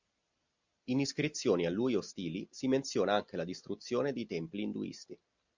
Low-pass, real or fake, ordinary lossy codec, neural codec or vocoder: 7.2 kHz; real; Opus, 64 kbps; none